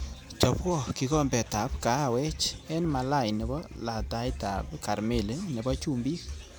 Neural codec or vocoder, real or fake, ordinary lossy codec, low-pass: vocoder, 44.1 kHz, 128 mel bands every 512 samples, BigVGAN v2; fake; none; none